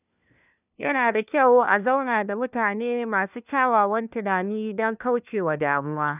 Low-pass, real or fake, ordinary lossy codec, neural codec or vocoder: 3.6 kHz; fake; none; codec, 16 kHz, 1 kbps, FunCodec, trained on LibriTTS, 50 frames a second